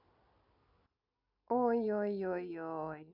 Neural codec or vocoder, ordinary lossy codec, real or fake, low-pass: none; none; real; 5.4 kHz